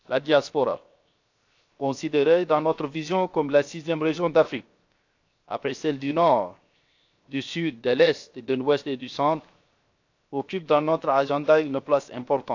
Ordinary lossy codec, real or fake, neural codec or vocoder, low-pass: AAC, 48 kbps; fake; codec, 16 kHz, 0.7 kbps, FocalCodec; 7.2 kHz